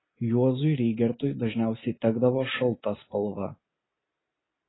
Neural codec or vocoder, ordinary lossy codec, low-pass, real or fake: none; AAC, 16 kbps; 7.2 kHz; real